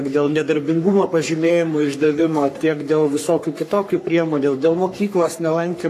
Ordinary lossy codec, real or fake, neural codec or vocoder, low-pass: AAC, 64 kbps; fake; codec, 44.1 kHz, 3.4 kbps, Pupu-Codec; 14.4 kHz